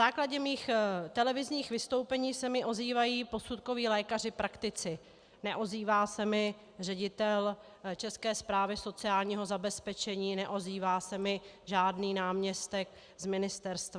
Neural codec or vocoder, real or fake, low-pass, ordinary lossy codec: none; real; 9.9 kHz; Opus, 64 kbps